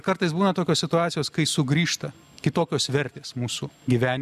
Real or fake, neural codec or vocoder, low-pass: real; none; 14.4 kHz